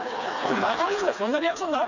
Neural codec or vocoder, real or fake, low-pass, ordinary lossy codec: codec, 16 kHz, 2 kbps, FreqCodec, smaller model; fake; 7.2 kHz; none